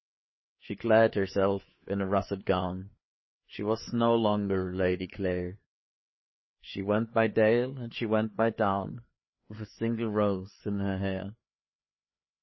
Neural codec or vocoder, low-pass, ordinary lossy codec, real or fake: codec, 16 kHz, 4 kbps, FreqCodec, larger model; 7.2 kHz; MP3, 24 kbps; fake